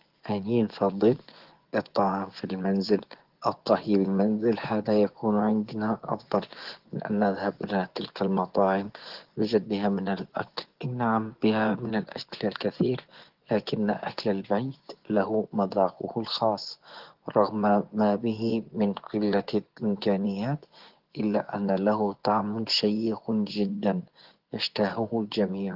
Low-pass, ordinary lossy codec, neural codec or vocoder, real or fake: 5.4 kHz; Opus, 16 kbps; vocoder, 24 kHz, 100 mel bands, Vocos; fake